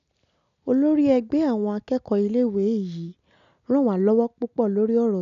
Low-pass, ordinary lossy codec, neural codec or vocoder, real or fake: 7.2 kHz; none; none; real